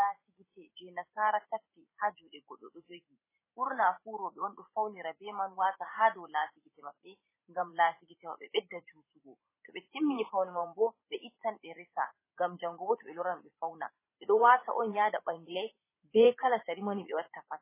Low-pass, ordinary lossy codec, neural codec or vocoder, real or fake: 3.6 kHz; MP3, 16 kbps; vocoder, 44.1 kHz, 128 mel bands every 512 samples, BigVGAN v2; fake